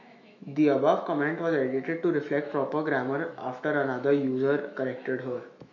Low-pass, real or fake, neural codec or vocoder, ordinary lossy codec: 7.2 kHz; fake; autoencoder, 48 kHz, 128 numbers a frame, DAC-VAE, trained on Japanese speech; MP3, 64 kbps